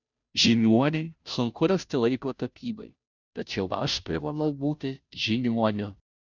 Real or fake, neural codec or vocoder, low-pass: fake; codec, 16 kHz, 0.5 kbps, FunCodec, trained on Chinese and English, 25 frames a second; 7.2 kHz